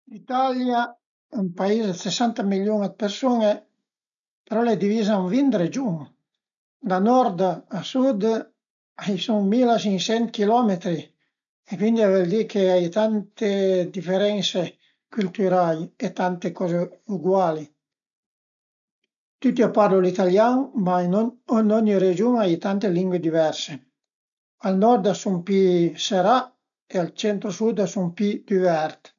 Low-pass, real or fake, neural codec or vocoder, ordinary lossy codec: 7.2 kHz; real; none; none